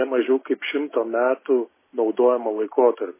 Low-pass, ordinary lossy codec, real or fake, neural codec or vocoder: 3.6 kHz; MP3, 16 kbps; real; none